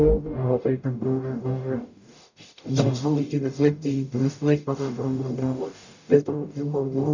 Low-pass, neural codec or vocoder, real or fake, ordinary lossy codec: 7.2 kHz; codec, 44.1 kHz, 0.9 kbps, DAC; fake; none